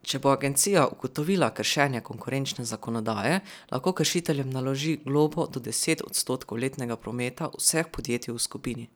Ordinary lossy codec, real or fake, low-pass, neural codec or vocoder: none; real; none; none